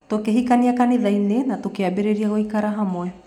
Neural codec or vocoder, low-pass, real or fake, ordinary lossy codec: none; 19.8 kHz; real; MP3, 96 kbps